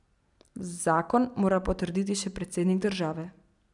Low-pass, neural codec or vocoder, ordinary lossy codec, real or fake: 10.8 kHz; none; none; real